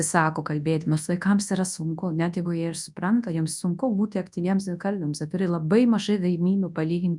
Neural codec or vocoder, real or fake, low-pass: codec, 24 kHz, 0.9 kbps, WavTokenizer, large speech release; fake; 10.8 kHz